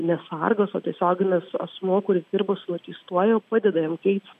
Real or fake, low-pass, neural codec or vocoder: real; 14.4 kHz; none